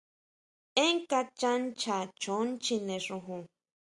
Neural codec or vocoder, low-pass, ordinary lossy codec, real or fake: none; 10.8 kHz; Opus, 64 kbps; real